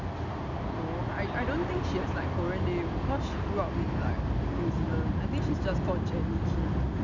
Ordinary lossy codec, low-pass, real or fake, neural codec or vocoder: MP3, 64 kbps; 7.2 kHz; real; none